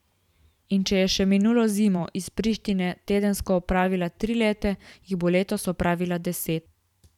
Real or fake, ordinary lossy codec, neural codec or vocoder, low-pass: fake; none; vocoder, 44.1 kHz, 128 mel bands every 512 samples, BigVGAN v2; 19.8 kHz